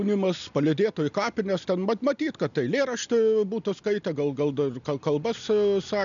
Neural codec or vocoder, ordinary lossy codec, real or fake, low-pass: none; MP3, 96 kbps; real; 7.2 kHz